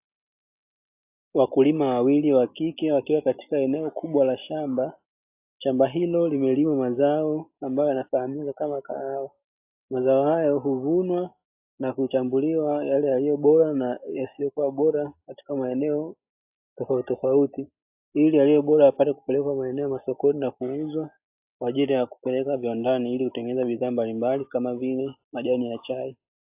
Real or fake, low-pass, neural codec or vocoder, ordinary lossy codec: real; 3.6 kHz; none; AAC, 32 kbps